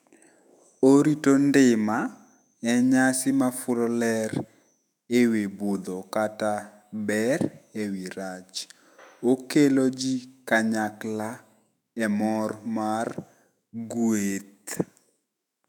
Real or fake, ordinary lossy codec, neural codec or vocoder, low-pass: fake; none; autoencoder, 48 kHz, 128 numbers a frame, DAC-VAE, trained on Japanese speech; 19.8 kHz